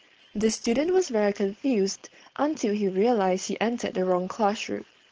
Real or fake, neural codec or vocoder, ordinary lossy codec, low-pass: fake; codec, 16 kHz, 4.8 kbps, FACodec; Opus, 16 kbps; 7.2 kHz